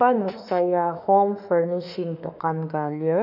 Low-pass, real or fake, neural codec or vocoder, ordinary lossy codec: 5.4 kHz; fake; autoencoder, 48 kHz, 32 numbers a frame, DAC-VAE, trained on Japanese speech; none